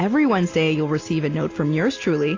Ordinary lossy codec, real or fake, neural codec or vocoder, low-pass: AAC, 32 kbps; real; none; 7.2 kHz